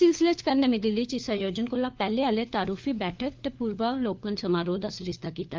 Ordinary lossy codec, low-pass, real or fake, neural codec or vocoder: Opus, 24 kbps; 7.2 kHz; fake; codec, 16 kHz, 4 kbps, FunCodec, trained on LibriTTS, 50 frames a second